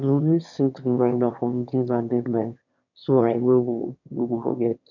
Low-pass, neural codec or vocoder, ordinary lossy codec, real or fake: 7.2 kHz; autoencoder, 22.05 kHz, a latent of 192 numbers a frame, VITS, trained on one speaker; none; fake